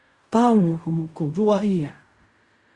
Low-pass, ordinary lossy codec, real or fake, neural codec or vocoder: 10.8 kHz; Opus, 64 kbps; fake; codec, 16 kHz in and 24 kHz out, 0.4 kbps, LongCat-Audio-Codec, fine tuned four codebook decoder